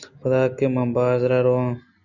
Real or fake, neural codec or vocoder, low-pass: real; none; 7.2 kHz